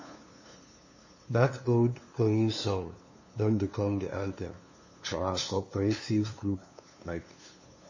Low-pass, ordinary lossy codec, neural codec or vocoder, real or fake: 7.2 kHz; MP3, 32 kbps; codec, 16 kHz, 2 kbps, FunCodec, trained on LibriTTS, 25 frames a second; fake